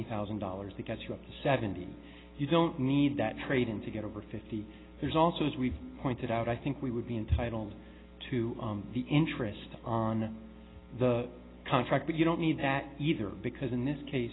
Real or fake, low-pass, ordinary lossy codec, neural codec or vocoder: real; 7.2 kHz; AAC, 16 kbps; none